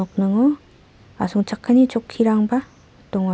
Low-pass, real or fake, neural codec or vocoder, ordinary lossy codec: none; real; none; none